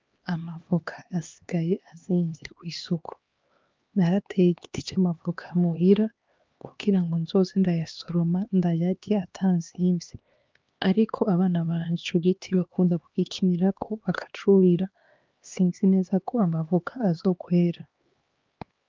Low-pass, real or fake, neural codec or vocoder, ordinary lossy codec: 7.2 kHz; fake; codec, 16 kHz, 2 kbps, X-Codec, HuBERT features, trained on LibriSpeech; Opus, 24 kbps